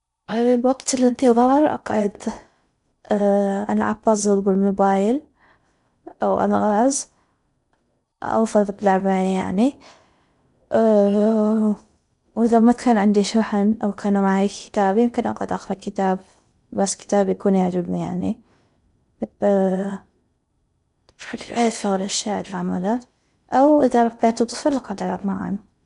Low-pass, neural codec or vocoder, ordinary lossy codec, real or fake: 10.8 kHz; codec, 16 kHz in and 24 kHz out, 0.6 kbps, FocalCodec, streaming, 4096 codes; none; fake